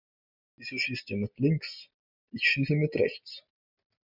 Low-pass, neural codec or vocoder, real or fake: 5.4 kHz; none; real